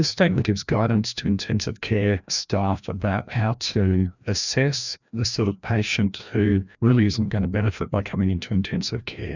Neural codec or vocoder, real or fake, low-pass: codec, 16 kHz, 1 kbps, FreqCodec, larger model; fake; 7.2 kHz